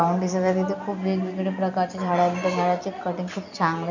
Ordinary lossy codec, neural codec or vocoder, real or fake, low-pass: none; none; real; 7.2 kHz